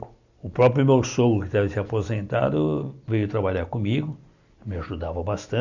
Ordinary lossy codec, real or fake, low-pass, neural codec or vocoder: none; real; 7.2 kHz; none